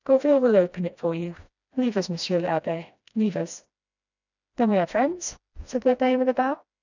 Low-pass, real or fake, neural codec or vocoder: 7.2 kHz; fake; codec, 16 kHz, 1 kbps, FreqCodec, smaller model